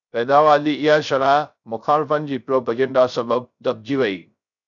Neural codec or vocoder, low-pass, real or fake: codec, 16 kHz, 0.3 kbps, FocalCodec; 7.2 kHz; fake